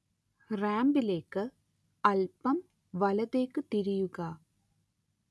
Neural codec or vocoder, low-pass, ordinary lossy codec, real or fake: none; none; none; real